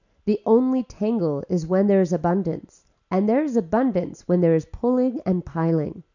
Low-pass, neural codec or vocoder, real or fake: 7.2 kHz; none; real